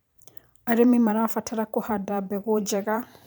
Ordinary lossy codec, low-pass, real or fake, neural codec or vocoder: none; none; fake; vocoder, 44.1 kHz, 128 mel bands every 512 samples, BigVGAN v2